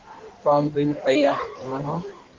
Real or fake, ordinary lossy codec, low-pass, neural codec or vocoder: fake; Opus, 16 kbps; 7.2 kHz; codec, 16 kHz in and 24 kHz out, 1.1 kbps, FireRedTTS-2 codec